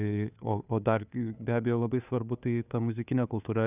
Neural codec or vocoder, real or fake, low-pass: codec, 16 kHz, 2 kbps, FunCodec, trained on Chinese and English, 25 frames a second; fake; 3.6 kHz